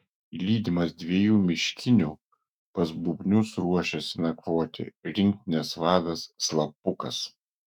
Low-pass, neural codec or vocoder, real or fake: 19.8 kHz; autoencoder, 48 kHz, 128 numbers a frame, DAC-VAE, trained on Japanese speech; fake